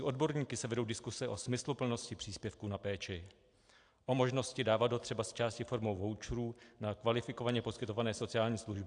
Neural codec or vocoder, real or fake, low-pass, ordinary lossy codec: none; real; 9.9 kHz; AAC, 64 kbps